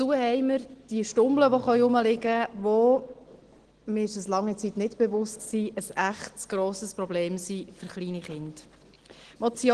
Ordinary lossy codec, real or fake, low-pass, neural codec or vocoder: Opus, 16 kbps; real; 9.9 kHz; none